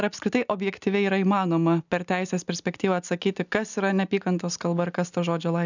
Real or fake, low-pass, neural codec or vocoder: real; 7.2 kHz; none